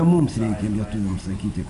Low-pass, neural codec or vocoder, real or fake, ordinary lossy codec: 10.8 kHz; none; real; MP3, 96 kbps